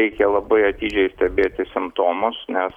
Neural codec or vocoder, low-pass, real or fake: none; 19.8 kHz; real